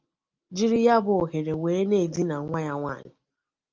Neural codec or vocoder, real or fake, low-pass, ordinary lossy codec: none; real; 7.2 kHz; Opus, 32 kbps